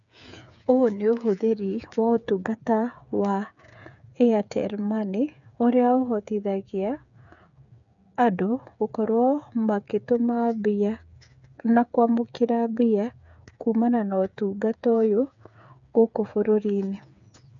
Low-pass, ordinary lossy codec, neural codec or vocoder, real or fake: 7.2 kHz; none; codec, 16 kHz, 8 kbps, FreqCodec, smaller model; fake